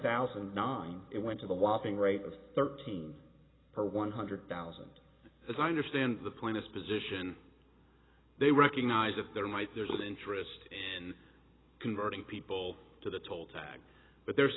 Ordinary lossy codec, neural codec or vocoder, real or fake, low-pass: AAC, 16 kbps; none; real; 7.2 kHz